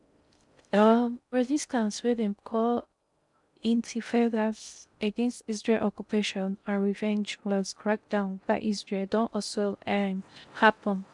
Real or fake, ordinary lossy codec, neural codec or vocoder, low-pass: fake; none; codec, 16 kHz in and 24 kHz out, 0.6 kbps, FocalCodec, streaming, 4096 codes; 10.8 kHz